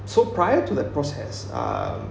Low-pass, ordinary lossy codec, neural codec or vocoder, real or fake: none; none; none; real